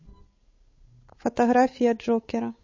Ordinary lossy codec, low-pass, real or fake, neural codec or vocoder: MP3, 32 kbps; 7.2 kHz; fake; codec, 16 kHz, 8 kbps, FunCodec, trained on Chinese and English, 25 frames a second